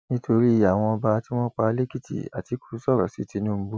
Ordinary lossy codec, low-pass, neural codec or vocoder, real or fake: none; none; none; real